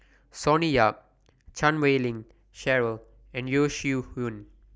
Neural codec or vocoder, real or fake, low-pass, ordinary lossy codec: none; real; none; none